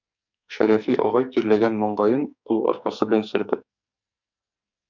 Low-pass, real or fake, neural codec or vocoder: 7.2 kHz; fake; codec, 44.1 kHz, 2.6 kbps, SNAC